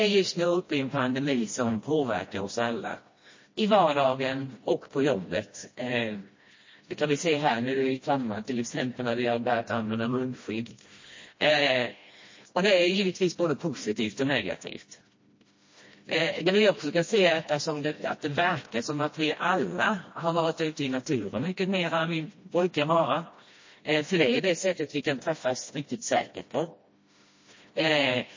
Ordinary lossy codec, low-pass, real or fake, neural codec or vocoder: MP3, 32 kbps; 7.2 kHz; fake; codec, 16 kHz, 1 kbps, FreqCodec, smaller model